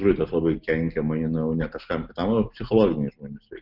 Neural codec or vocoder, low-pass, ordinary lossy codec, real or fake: none; 5.4 kHz; Opus, 32 kbps; real